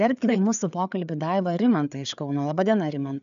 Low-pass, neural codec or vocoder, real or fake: 7.2 kHz; codec, 16 kHz, 4 kbps, FreqCodec, larger model; fake